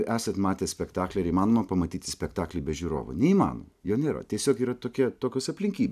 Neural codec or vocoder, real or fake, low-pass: none; real; 14.4 kHz